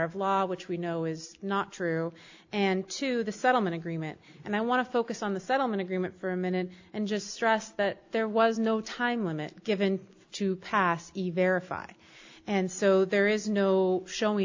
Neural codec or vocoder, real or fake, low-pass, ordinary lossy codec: none; real; 7.2 kHz; AAC, 48 kbps